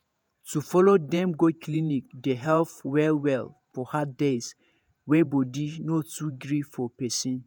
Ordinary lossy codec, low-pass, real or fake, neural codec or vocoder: none; none; fake; vocoder, 48 kHz, 128 mel bands, Vocos